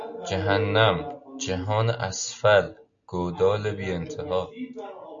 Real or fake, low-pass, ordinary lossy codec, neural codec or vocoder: real; 7.2 kHz; MP3, 64 kbps; none